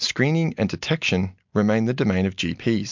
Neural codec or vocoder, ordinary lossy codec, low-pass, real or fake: none; MP3, 64 kbps; 7.2 kHz; real